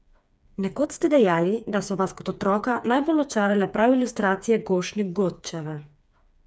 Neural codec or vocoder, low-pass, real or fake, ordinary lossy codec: codec, 16 kHz, 4 kbps, FreqCodec, smaller model; none; fake; none